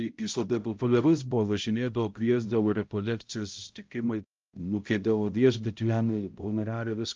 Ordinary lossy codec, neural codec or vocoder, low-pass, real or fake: Opus, 24 kbps; codec, 16 kHz, 0.5 kbps, X-Codec, HuBERT features, trained on balanced general audio; 7.2 kHz; fake